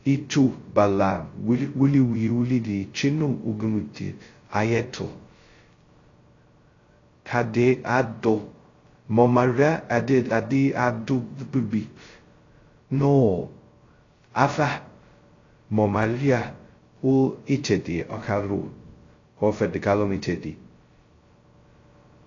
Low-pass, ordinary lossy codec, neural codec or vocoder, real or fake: 7.2 kHz; AAC, 32 kbps; codec, 16 kHz, 0.2 kbps, FocalCodec; fake